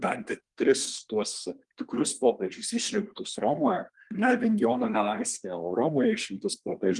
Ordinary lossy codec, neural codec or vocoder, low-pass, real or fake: Opus, 24 kbps; codec, 24 kHz, 1 kbps, SNAC; 10.8 kHz; fake